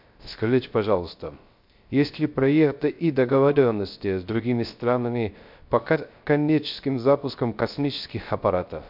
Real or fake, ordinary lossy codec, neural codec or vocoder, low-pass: fake; MP3, 48 kbps; codec, 16 kHz, 0.3 kbps, FocalCodec; 5.4 kHz